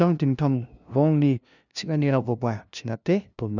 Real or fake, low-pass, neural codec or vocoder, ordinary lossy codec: fake; 7.2 kHz; codec, 16 kHz, 0.5 kbps, FunCodec, trained on LibriTTS, 25 frames a second; none